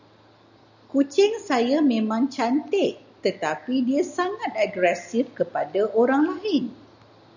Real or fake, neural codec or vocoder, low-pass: real; none; 7.2 kHz